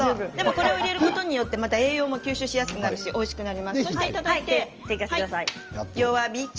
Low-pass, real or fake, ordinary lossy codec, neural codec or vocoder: 7.2 kHz; real; Opus, 24 kbps; none